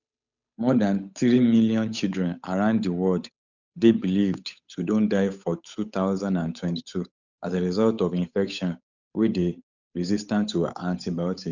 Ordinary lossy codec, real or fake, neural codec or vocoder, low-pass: none; fake; codec, 16 kHz, 8 kbps, FunCodec, trained on Chinese and English, 25 frames a second; 7.2 kHz